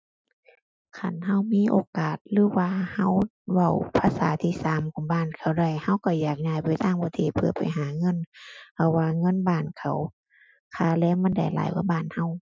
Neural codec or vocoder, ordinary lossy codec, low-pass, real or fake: none; none; none; real